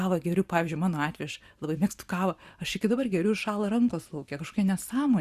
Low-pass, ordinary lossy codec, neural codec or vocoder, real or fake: 14.4 kHz; Opus, 64 kbps; vocoder, 44.1 kHz, 128 mel bands every 512 samples, BigVGAN v2; fake